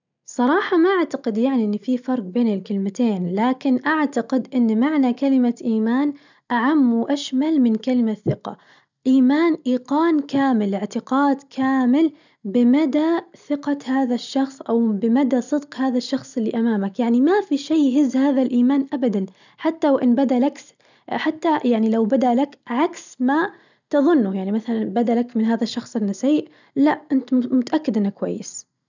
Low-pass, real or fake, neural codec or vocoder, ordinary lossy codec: 7.2 kHz; real; none; none